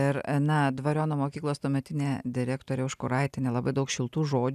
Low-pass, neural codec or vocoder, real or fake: 14.4 kHz; none; real